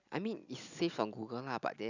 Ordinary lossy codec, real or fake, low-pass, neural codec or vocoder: none; real; 7.2 kHz; none